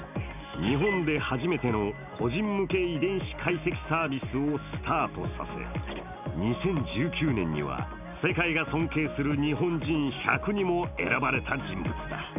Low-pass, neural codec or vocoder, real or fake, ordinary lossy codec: 3.6 kHz; none; real; none